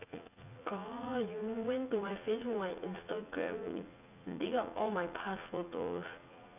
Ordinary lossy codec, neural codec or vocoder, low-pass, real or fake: none; vocoder, 44.1 kHz, 80 mel bands, Vocos; 3.6 kHz; fake